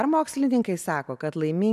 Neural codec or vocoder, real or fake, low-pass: none; real; 14.4 kHz